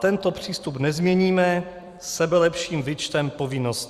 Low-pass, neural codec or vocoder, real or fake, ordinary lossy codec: 14.4 kHz; vocoder, 44.1 kHz, 128 mel bands every 256 samples, BigVGAN v2; fake; Opus, 64 kbps